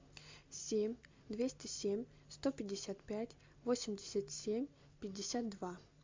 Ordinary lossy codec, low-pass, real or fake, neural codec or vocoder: MP3, 64 kbps; 7.2 kHz; real; none